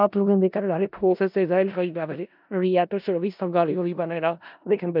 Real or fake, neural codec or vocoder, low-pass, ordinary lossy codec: fake; codec, 16 kHz in and 24 kHz out, 0.4 kbps, LongCat-Audio-Codec, four codebook decoder; 5.4 kHz; none